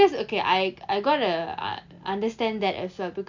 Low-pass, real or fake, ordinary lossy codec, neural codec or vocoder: 7.2 kHz; real; MP3, 64 kbps; none